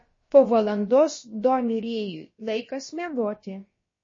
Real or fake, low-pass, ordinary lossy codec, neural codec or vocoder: fake; 7.2 kHz; MP3, 32 kbps; codec, 16 kHz, about 1 kbps, DyCAST, with the encoder's durations